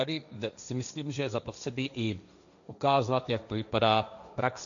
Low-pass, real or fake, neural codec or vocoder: 7.2 kHz; fake; codec, 16 kHz, 1.1 kbps, Voila-Tokenizer